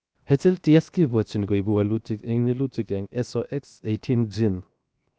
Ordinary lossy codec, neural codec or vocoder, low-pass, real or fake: none; codec, 16 kHz, 0.7 kbps, FocalCodec; none; fake